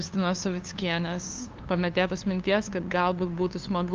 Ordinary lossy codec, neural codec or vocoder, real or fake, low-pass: Opus, 24 kbps; codec, 16 kHz, 2 kbps, FunCodec, trained on LibriTTS, 25 frames a second; fake; 7.2 kHz